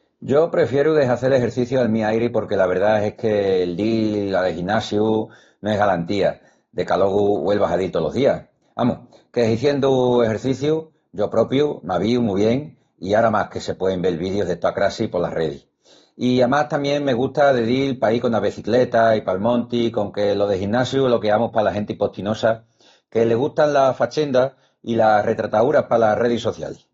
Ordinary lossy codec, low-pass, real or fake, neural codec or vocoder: AAC, 32 kbps; 7.2 kHz; real; none